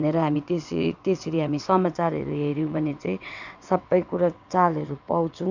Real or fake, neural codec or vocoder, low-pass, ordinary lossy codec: fake; vocoder, 22.05 kHz, 80 mel bands, WaveNeXt; 7.2 kHz; none